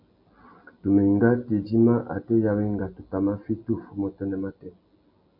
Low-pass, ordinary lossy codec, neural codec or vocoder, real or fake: 5.4 kHz; MP3, 48 kbps; none; real